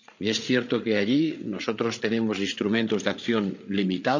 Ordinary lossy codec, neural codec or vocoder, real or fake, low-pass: none; codec, 16 kHz, 8 kbps, FreqCodec, larger model; fake; 7.2 kHz